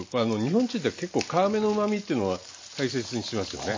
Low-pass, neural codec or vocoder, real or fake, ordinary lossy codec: 7.2 kHz; none; real; MP3, 32 kbps